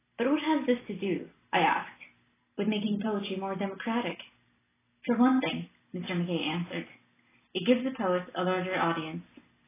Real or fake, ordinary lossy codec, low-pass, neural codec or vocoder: real; AAC, 16 kbps; 3.6 kHz; none